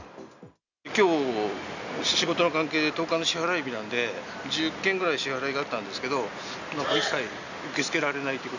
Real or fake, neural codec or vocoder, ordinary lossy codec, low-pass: real; none; none; 7.2 kHz